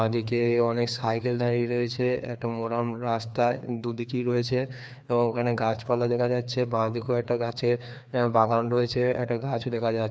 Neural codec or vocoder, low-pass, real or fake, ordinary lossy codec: codec, 16 kHz, 2 kbps, FreqCodec, larger model; none; fake; none